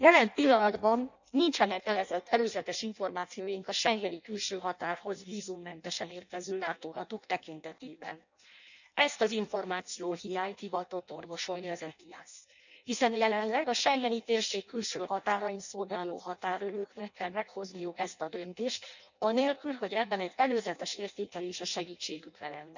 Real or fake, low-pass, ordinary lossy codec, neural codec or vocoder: fake; 7.2 kHz; none; codec, 16 kHz in and 24 kHz out, 0.6 kbps, FireRedTTS-2 codec